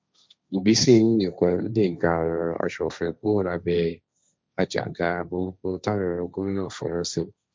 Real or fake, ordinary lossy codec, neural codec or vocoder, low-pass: fake; none; codec, 16 kHz, 1.1 kbps, Voila-Tokenizer; none